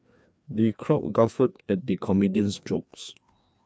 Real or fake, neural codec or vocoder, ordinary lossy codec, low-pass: fake; codec, 16 kHz, 2 kbps, FreqCodec, larger model; none; none